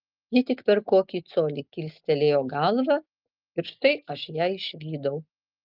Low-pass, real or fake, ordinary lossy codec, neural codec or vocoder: 5.4 kHz; real; Opus, 24 kbps; none